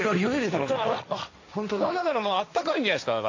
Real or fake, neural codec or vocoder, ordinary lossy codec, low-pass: fake; codec, 16 kHz, 1.1 kbps, Voila-Tokenizer; AAC, 48 kbps; 7.2 kHz